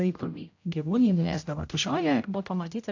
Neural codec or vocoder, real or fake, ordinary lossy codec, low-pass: codec, 16 kHz, 0.5 kbps, X-Codec, HuBERT features, trained on general audio; fake; AAC, 48 kbps; 7.2 kHz